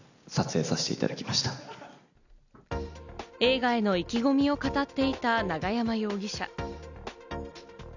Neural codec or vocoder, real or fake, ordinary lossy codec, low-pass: none; real; none; 7.2 kHz